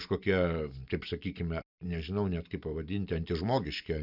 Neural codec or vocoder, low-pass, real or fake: none; 5.4 kHz; real